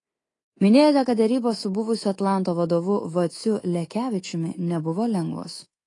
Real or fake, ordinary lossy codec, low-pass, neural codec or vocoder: fake; AAC, 32 kbps; 10.8 kHz; codec, 24 kHz, 3.1 kbps, DualCodec